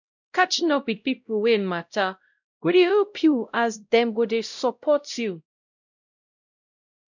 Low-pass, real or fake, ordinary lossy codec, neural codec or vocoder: 7.2 kHz; fake; none; codec, 16 kHz, 0.5 kbps, X-Codec, WavLM features, trained on Multilingual LibriSpeech